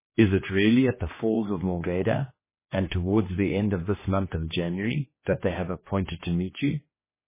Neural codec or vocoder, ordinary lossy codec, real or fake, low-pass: codec, 16 kHz, 2 kbps, X-Codec, HuBERT features, trained on general audio; MP3, 16 kbps; fake; 3.6 kHz